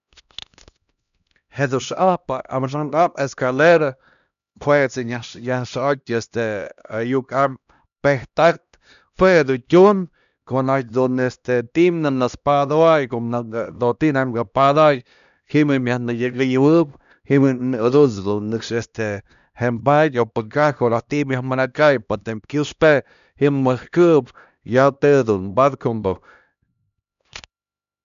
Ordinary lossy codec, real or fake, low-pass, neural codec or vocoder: none; fake; 7.2 kHz; codec, 16 kHz, 1 kbps, X-Codec, HuBERT features, trained on LibriSpeech